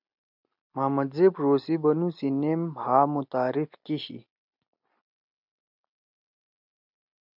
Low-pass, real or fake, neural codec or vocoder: 5.4 kHz; real; none